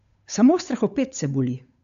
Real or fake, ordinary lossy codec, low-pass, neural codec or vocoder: fake; AAC, 96 kbps; 7.2 kHz; codec, 16 kHz, 8 kbps, FunCodec, trained on Chinese and English, 25 frames a second